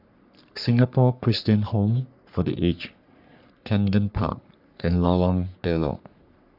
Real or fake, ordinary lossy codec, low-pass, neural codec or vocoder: fake; none; 5.4 kHz; codec, 44.1 kHz, 3.4 kbps, Pupu-Codec